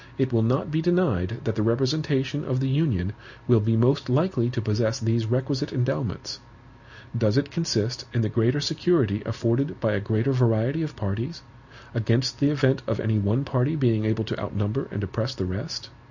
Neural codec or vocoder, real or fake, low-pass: none; real; 7.2 kHz